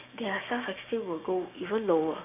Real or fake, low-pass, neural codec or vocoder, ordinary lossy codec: fake; 3.6 kHz; codec, 16 kHz, 6 kbps, DAC; none